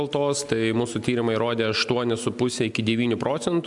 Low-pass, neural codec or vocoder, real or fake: 10.8 kHz; none; real